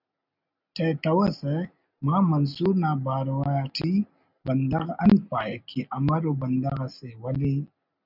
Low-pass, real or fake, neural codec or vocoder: 5.4 kHz; real; none